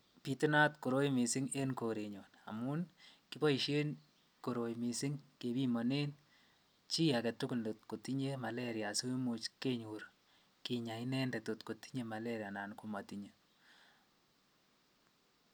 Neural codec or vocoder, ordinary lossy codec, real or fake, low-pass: none; none; real; none